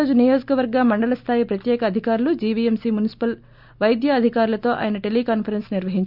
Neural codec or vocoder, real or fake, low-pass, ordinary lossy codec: none; real; 5.4 kHz; none